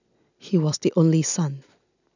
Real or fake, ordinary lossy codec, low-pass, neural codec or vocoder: real; none; 7.2 kHz; none